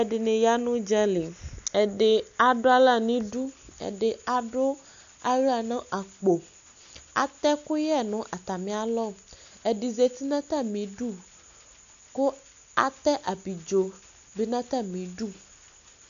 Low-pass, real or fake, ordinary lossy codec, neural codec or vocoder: 7.2 kHz; real; AAC, 96 kbps; none